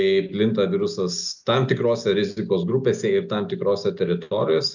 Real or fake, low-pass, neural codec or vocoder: real; 7.2 kHz; none